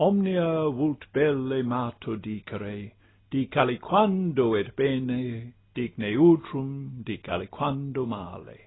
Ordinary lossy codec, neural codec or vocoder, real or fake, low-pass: AAC, 16 kbps; none; real; 7.2 kHz